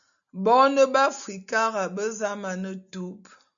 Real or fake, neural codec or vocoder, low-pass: real; none; 7.2 kHz